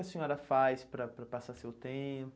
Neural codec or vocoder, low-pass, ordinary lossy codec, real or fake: none; none; none; real